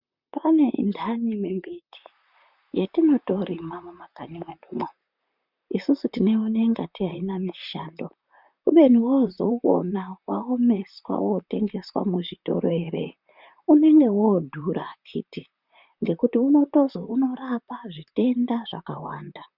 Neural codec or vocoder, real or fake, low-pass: vocoder, 44.1 kHz, 128 mel bands, Pupu-Vocoder; fake; 5.4 kHz